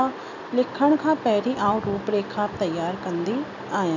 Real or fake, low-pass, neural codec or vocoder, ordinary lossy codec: real; 7.2 kHz; none; none